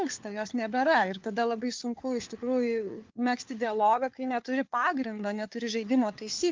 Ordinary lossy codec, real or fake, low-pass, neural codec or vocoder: Opus, 24 kbps; fake; 7.2 kHz; codec, 16 kHz, 4 kbps, FunCodec, trained on LibriTTS, 50 frames a second